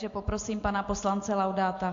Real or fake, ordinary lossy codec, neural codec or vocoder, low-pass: real; AAC, 48 kbps; none; 7.2 kHz